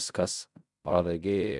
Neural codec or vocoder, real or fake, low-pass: codec, 16 kHz in and 24 kHz out, 0.4 kbps, LongCat-Audio-Codec, fine tuned four codebook decoder; fake; 10.8 kHz